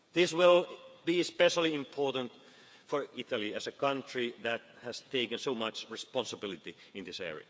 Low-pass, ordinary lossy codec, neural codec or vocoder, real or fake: none; none; codec, 16 kHz, 8 kbps, FreqCodec, smaller model; fake